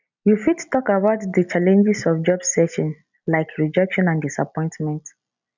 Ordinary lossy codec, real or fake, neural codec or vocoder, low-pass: none; real; none; 7.2 kHz